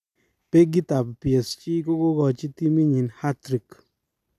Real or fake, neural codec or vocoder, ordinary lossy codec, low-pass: real; none; none; 14.4 kHz